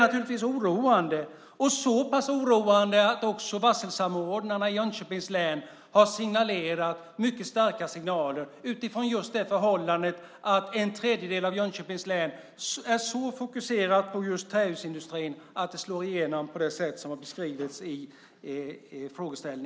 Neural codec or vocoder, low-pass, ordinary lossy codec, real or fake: none; none; none; real